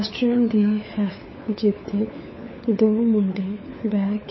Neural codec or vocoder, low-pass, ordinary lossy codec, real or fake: codec, 16 kHz, 4 kbps, FunCodec, trained on LibriTTS, 50 frames a second; 7.2 kHz; MP3, 24 kbps; fake